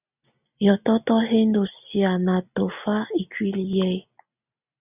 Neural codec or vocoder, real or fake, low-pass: none; real; 3.6 kHz